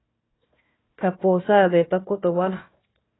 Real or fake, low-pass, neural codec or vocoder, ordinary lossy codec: fake; 7.2 kHz; codec, 16 kHz, 1 kbps, FunCodec, trained on Chinese and English, 50 frames a second; AAC, 16 kbps